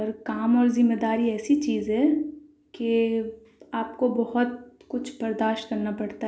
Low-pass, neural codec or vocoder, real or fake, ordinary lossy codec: none; none; real; none